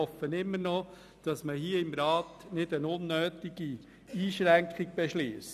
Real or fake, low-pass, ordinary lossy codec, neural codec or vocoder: real; 14.4 kHz; none; none